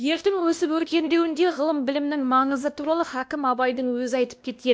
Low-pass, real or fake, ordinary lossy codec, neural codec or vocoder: none; fake; none; codec, 16 kHz, 1 kbps, X-Codec, WavLM features, trained on Multilingual LibriSpeech